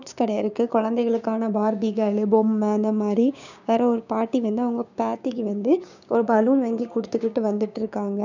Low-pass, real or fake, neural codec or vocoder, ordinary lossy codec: 7.2 kHz; fake; codec, 16 kHz, 6 kbps, DAC; none